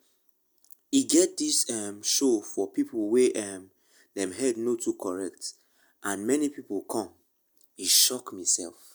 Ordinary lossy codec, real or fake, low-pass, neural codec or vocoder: none; real; none; none